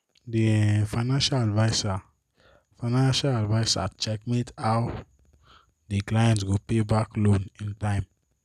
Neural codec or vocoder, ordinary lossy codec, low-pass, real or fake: none; none; 14.4 kHz; real